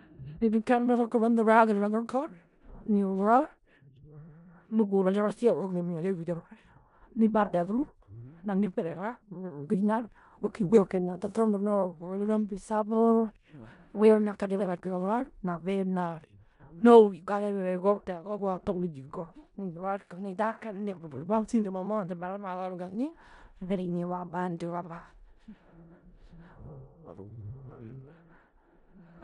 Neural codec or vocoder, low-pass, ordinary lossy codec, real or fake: codec, 16 kHz in and 24 kHz out, 0.4 kbps, LongCat-Audio-Codec, four codebook decoder; 10.8 kHz; none; fake